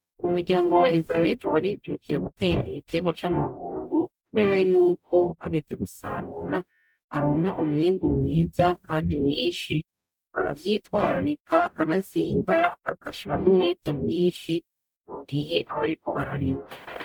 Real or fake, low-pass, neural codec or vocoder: fake; 19.8 kHz; codec, 44.1 kHz, 0.9 kbps, DAC